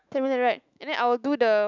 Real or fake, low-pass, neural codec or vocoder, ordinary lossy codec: fake; 7.2 kHz; autoencoder, 48 kHz, 128 numbers a frame, DAC-VAE, trained on Japanese speech; none